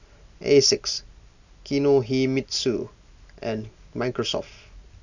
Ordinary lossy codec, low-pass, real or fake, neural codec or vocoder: none; 7.2 kHz; real; none